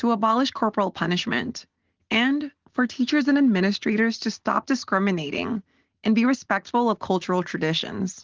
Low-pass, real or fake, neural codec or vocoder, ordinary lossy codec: 7.2 kHz; real; none; Opus, 16 kbps